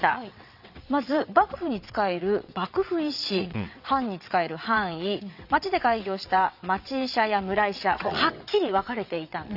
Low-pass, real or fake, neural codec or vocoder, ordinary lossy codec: 5.4 kHz; fake; vocoder, 22.05 kHz, 80 mel bands, WaveNeXt; none